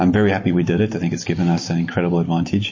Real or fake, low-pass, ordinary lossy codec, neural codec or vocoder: fake; 7.2 kHz; MP3, 32 kbps; vocoder, 44.1 kHz, 128 mel bands every 256 samples, BigVGAN v2